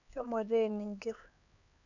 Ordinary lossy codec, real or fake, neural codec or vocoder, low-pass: none; fake; codec, 16 kHz, 4 kbps, X-Codec, HuBERT features, trained on LibriSpeech; 7.2 kHz